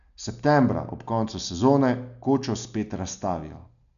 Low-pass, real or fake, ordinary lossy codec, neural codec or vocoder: 7.2 kHz; real; MP3, 96 kbps; none